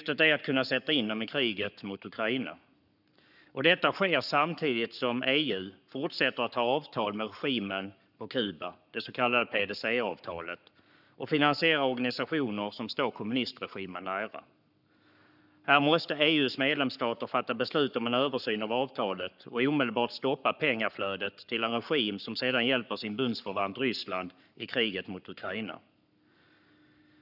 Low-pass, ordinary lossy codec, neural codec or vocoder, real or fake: 5.4 kHz; none; codec, 44.1 kHz, 7.8 kbps, Pupu-Codec; fake